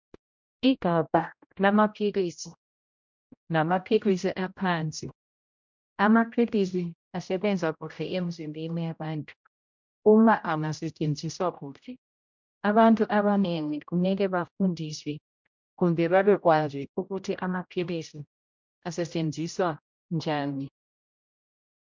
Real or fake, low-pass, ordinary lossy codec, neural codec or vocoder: fake; 7.2 kHz; MP3, 64 kbps; codec, 16 kHz, 0.5 kbps, X-Codec, HuBERT features, trained on general audio